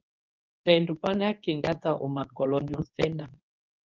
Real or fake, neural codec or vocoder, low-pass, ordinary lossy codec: fake; codec, 24 kHz, 0.9 kbps, WavTokenizer, medium speech release version 2; 7.2 kHz; Opus, 24 kbps